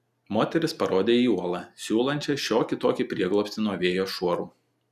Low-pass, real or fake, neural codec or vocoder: 14.4 kHz; real; none